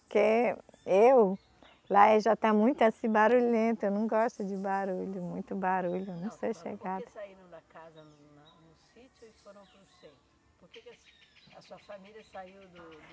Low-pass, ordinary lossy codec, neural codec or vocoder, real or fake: none; none; none; real